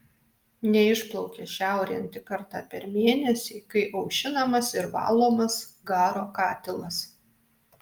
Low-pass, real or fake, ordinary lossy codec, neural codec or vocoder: 19.8 kHz; real; Opus, 32 kbps; none